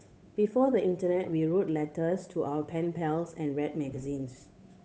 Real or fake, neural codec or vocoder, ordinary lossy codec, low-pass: fake; codec, 16 kHz, 8 kbps, FunCodec, trained on Chinese and English, 25 frames a second; none; none